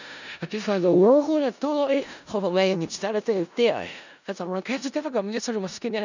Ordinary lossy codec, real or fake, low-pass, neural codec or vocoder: none; fake; 7.2 kHz; codec, 16 kHz in and 24 kHz out, 0.4 kbps, LongCat-Audio-Codec, four codebook decoder